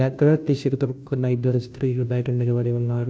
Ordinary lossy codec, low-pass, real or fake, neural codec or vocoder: none; none; fake; codec, 16 kHz, 0.5 kbps, FunCodec, trained on Chinese and English, 25 frames a second